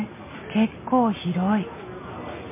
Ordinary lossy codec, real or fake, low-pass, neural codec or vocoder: MP3, 16 kbps; real; 3.6 kHz; none